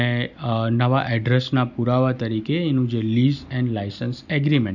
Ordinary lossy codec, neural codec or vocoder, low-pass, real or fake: none; none; 7.2 kHz; real